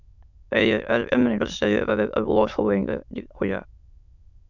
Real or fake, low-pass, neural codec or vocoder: fake; 7.2 kHz; autoencoder, 22.05 kHz, a latent of 192 numbers a frame, VITS, trained on many speakers